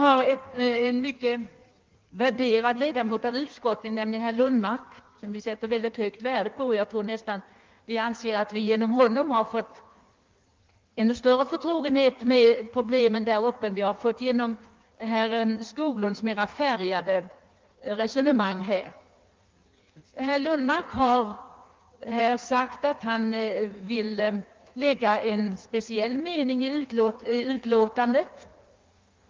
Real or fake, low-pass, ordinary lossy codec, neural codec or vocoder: fake; 7.2 kHz; Opus, 32 kbps; codec, 16 kHz in and 24 kHz out, 1.1 kbps, FireRedTTS-2 codec